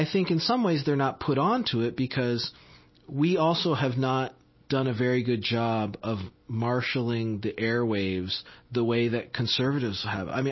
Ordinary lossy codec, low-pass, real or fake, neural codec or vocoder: MP3, 24 kbps; 7.2 kHz; real; none